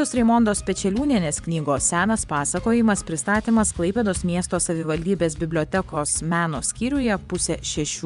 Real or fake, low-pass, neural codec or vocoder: fake; 10.8 kHz; vocoder, 24 kHz, 100 mel bands, Vocos